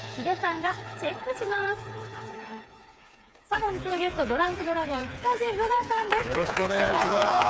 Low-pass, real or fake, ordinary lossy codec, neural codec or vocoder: none; fake; none; codec, 16 kHz, 4 kbps, FreqCodec, smaller model